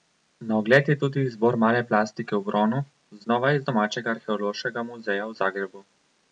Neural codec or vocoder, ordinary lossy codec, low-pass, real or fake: none; none; 9.9 kHz; real